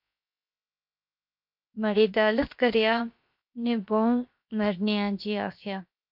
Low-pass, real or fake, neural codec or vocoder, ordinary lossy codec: 5.4 kHz; fake; codec, 16 kHz, 0.7 kbps, FocalCodec; MP3, 48 kbps